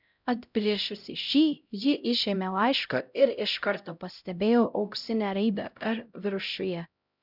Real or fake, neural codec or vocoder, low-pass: fake; codec, 16 kHz, 0.5 kbps, X-Codec, HuBERT features, trained on LibriSpeech; 5.4 kHz